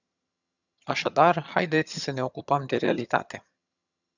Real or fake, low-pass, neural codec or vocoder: fake; 7.2 kHz; vocoder, 22.05 kHz, 80 mel bands, HiFi-GAN